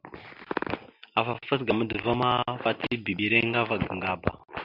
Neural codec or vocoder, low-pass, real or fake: none; 5.4 kHz; real